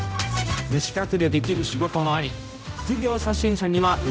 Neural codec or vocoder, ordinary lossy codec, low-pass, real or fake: codec, 16 kHz, 0.5 kbps, X-Codec, HuBERT features, trained on general audio; none; none; fake